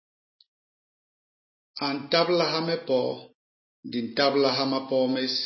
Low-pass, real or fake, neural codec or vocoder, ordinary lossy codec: 7.2 kHz; real; none; MP3, 24 kbps